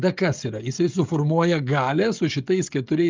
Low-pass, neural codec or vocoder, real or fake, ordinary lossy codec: 7.2 kHz; codec, 16 kHz, 16 kbps, FreqCodec, smaller model; fake; Opus, 24 kbps